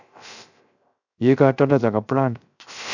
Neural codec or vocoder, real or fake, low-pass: codec, 16 kHz, 0.3 kbps, FocalCodec; fake; 7.2 kHz